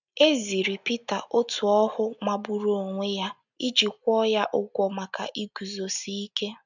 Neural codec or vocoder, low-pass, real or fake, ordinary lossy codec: none; 7.2 kHz; real; none